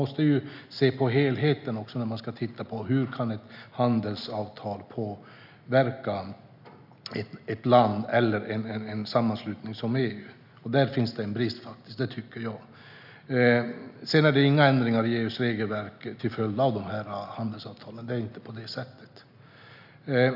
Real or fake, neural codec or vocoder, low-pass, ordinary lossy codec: real; none; 5.4 kHz; none